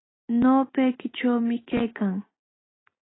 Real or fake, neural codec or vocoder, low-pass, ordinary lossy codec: real; none; 7.2 kHz; AAC, 16 kbps